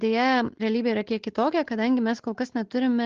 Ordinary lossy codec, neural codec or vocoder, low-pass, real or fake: Opus, 16 kbps; none; 7.2 kHz; real